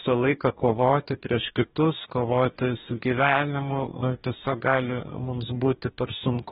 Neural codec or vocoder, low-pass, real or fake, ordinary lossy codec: codec, 44.1 kHz, 2.6 kbps, DAC; 19.8 kHz; fake; AAC, 16 kbps